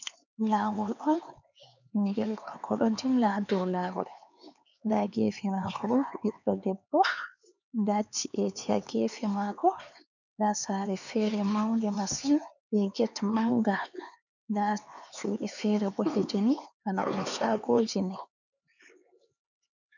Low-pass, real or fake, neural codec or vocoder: 7.2 kHz; fake; codec, 16 kHz, 4 kbps, X-Codec, HuBERT features, trained on LibriSpeech